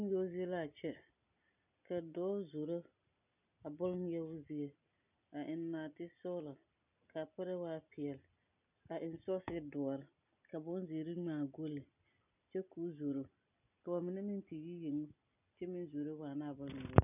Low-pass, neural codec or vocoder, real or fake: 3.6 kHz; none; real